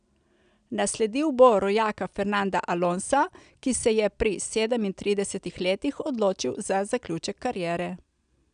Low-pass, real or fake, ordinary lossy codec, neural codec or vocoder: 9.9 kHz; real; none; none